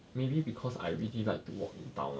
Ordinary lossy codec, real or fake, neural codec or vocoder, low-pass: none; real; none; none